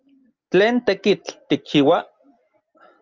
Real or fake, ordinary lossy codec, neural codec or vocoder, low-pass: real; Opus, 24 kbps; none; 7.2 kHz